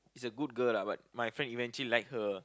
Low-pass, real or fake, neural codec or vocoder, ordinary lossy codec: none; real; none; none